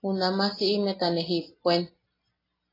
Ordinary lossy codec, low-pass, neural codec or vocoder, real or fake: AAC, 24 kbps; 5.4 kHz; none; real